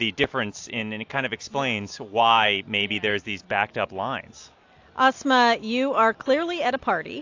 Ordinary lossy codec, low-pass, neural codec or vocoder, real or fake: AAC, 48 kbps; 7.2 kHz; none; real